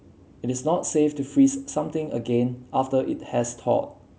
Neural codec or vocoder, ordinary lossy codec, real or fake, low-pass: none; none; real; none